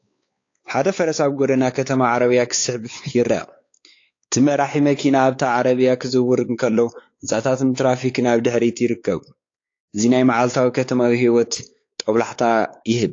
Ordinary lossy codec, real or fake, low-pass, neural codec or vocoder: AAC, 48 kbps; fake; 7.2 kHz; codec, 16 kHz, 4 kbps, X-Codec, WavLM features, trained on Multilingual LibriSpeech